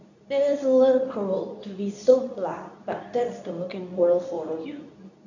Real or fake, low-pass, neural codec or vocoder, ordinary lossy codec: fake; 7.2 kHz; codec, 24 kHz, 0.9 kbps, WavTokenizer, medium speech release version 2; none